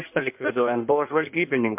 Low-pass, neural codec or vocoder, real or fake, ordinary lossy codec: 3.6 kHz; codec, 16 kHz in and 24 kHz out, 1.1 kbps, FireRedTTS-2 codec; fake; MP3, 32 kbps